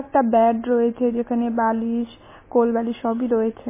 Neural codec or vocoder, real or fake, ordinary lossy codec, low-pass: none; real; MP3, 16 kbps; 3.6 kHz